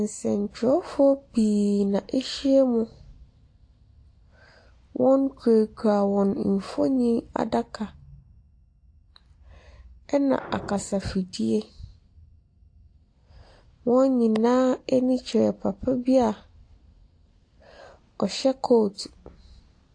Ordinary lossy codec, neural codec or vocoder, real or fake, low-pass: AAC, 48 kbps; none; real; 9.9 kHz